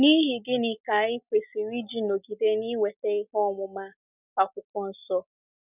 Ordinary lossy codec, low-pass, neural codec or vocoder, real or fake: none; 3.6 kHz; none; real